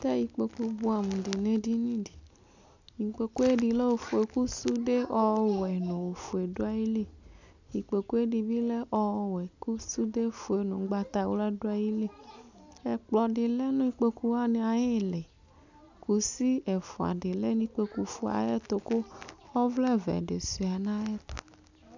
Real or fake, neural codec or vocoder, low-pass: real; none; 7.2 kHz